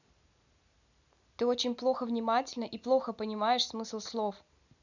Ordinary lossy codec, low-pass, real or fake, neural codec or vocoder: none; 7.2 kHz; real; none